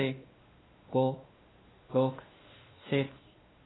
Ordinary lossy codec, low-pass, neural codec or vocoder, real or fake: AAC, 16 kbps; 7.2 kHz; codec, 16 kHz, 2 kbps, X-Codec, WavLM features, trained on Multilingual LibriSpeech; fake